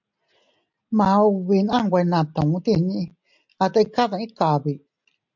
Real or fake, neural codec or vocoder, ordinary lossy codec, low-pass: real; none; MP3, 64 kbps; 7.2 kHz